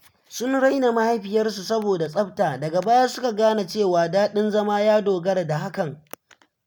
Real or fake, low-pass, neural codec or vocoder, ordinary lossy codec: real; none; none; none